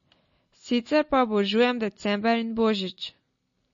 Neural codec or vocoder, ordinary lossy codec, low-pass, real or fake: none; MP3, 32 kbps; 7.2 kHz; real